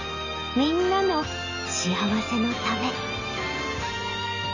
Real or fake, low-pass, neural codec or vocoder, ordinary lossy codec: real; 7.2 kHz; none; none